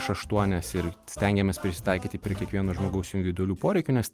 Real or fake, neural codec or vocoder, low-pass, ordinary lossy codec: fake; vocoder, 44.1 kHz, 128 mel bands every 256 samples, BigVGAN v2; 14.4 kHz; Opus, 32 kbps